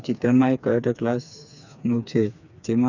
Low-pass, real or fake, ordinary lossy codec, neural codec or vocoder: 7.2 kHz; fake; none; codec, 16 kHz, 4 kbps, FreqCodec, smaller model